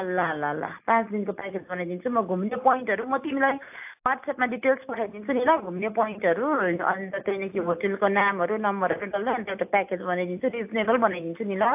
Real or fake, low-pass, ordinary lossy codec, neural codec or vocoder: real; 3.6 kHz; AAC, 32 kbps; none